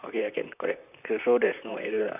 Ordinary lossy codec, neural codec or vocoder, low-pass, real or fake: none; vocoder, 44.1 kHz, 128 mel bands, Pupu-Vocoder; 3.6 kHz; fake